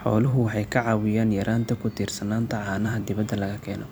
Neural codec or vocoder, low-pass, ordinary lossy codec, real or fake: vocoder, 44.1 kHz, 128 mel bands every 256 samples, BigVGAN v2; none; none; fake